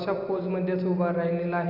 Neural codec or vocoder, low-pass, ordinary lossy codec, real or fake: none; 5.4 kHz; none; real